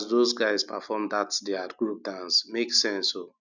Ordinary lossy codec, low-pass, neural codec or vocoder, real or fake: none; 7.2 kHz; none; real